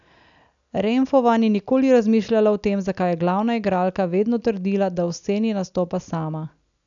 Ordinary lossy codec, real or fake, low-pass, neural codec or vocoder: none; real; 7.2 kHz; none